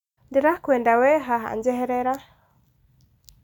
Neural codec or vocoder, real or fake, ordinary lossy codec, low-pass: none; real; none; 19.8 kHz